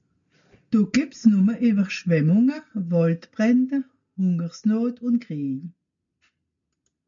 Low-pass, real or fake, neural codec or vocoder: 7.2 kHz; real; none